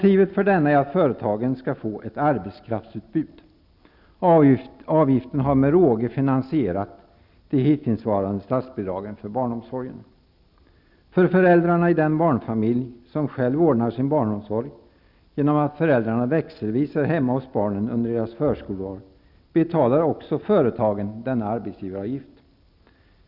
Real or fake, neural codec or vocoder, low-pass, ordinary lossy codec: real; none; 5.4 kHz; none